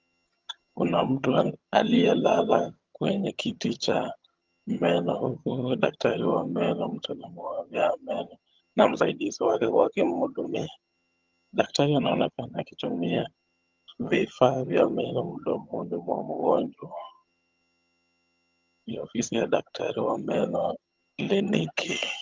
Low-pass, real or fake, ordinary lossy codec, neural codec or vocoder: 7.2 kHz; fake; Opus, 32 kbps; vocoder, 22.05 kHz, 80 mel bands, HiFi-GAN